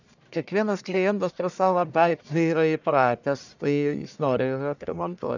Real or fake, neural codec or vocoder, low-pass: fake; codec, 44.1 kHz, 1.7 kbps, Pupu-Codec; 7.2 kHz